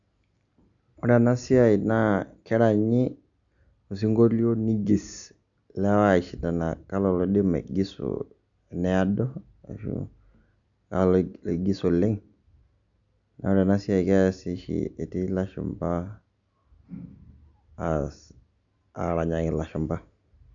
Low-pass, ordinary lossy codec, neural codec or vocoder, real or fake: 7.2 kHz; none; none; real